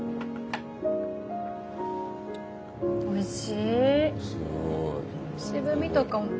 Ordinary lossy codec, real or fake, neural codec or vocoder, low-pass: none; real; none; none